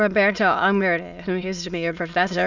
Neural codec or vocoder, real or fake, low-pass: autoencoder, 22.05 kHz, a latent of 192 numbers a frame, VITS, trained on many speakers; fake; 7.2 kHz